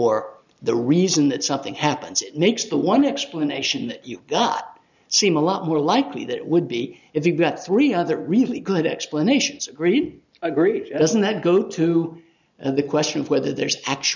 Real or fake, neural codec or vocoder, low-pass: real; none; 7.2 kHz